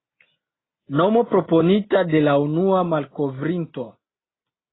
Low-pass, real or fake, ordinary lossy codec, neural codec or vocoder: 7.2 kHz; real; AAC, 16 kbps; none